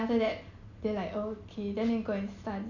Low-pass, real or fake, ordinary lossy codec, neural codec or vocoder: 7.2 kHz; real; none; none